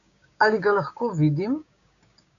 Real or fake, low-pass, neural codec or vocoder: fake; 7.2 kHz; codec, 16 kHz, 6 kbps, DAC